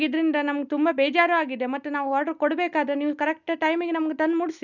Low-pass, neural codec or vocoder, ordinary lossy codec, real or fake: 7.2 kHz; none; none; real